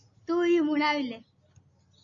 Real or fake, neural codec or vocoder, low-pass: real; none; 7.2 kHz